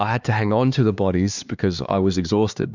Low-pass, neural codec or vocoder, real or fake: 7.2 kHz; codec, 16 kHz, 2 kbps, X-Codec, HuBERT features, trained on LibriSpeech; fake